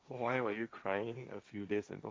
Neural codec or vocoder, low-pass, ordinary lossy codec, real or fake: codec, 16 kHz, 1.1 kbps, Voila-Tokenizer; none; none; fake